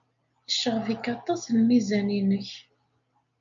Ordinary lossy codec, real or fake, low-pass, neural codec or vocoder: MP3, 64 kbps; real; 7.2 kHz; none